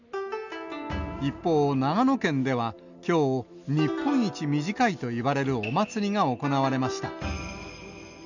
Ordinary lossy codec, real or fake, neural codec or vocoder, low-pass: none; real; none; 7.2 kHz